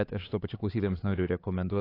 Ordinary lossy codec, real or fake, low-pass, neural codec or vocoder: AAC, 24 kbps; fake; 5.4 kHz; codec, 16 kHz, 4 kbps, X-Codec, HuBERT features, trained on LibriSpeech